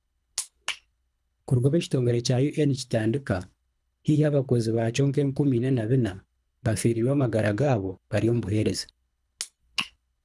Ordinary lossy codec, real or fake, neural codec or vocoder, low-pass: none; fake; codec, 24 kHz, 3 kbps, HILCodec; none